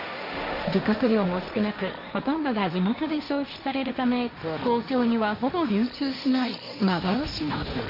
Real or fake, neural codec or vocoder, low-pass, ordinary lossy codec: fake; codec, 16 kHz, 1.1 kbps, Voila-Tokenizer; 5.4 kHz; none